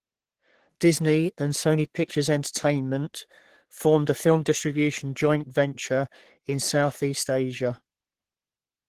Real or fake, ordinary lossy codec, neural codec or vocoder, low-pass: fake; Opus, 16 kbps; codec, 44.1 kHz, 3.4 kbps, Pupu-Codec; 14.4 kHz